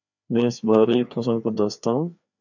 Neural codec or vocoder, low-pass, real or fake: codec, 16 kHz, 2 kbps, FreqCodec, larger model; 7.2 kHz; fake